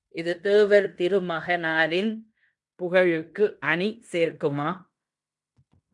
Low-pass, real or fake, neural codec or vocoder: 10.8 kHz; fake; codec, 16 kHz in and 24 kHz out, 0.9 kbps, LongCat-Audio-Codec, fine tuned four codebook decoder